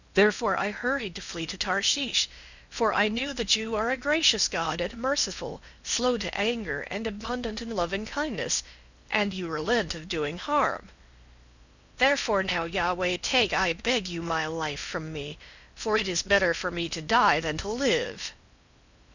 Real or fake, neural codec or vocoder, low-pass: fake; codec, 16 kHz in and 24 kHz out, 0.8 kbps, FocalCodec, streaming, 65536 codes; 7.2 kHz